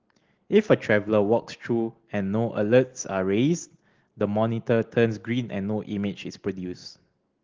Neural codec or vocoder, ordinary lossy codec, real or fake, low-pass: none; Opus, 16 kbps; real; 7.2 kHz